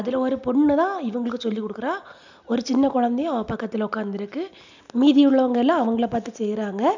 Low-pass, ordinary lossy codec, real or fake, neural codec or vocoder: 7.2 kHz; none; real; none